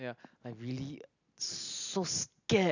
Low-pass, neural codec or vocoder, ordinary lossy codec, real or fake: 7.2 kHz; none; none; real